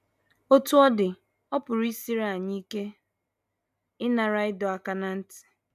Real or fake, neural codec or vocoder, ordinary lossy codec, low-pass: real; none; none; 14.4 kHz